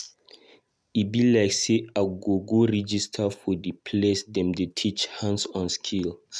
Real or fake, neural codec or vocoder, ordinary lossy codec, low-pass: real; none; none; none